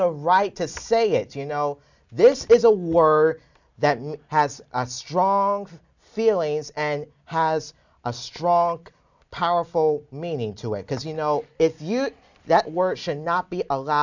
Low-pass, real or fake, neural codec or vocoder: 7.2 kHz; real; none